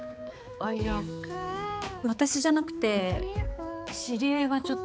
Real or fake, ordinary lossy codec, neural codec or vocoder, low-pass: fake; none; codec, 16 kHz, 4 kbps, X-Codec, HuBERT features, trained on balanced general audio; none